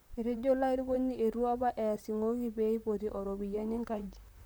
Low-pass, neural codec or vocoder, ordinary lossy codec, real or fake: none; vocoder, 44.1 kHz, 128 mel bands, Pupu-Vocoder; none; fake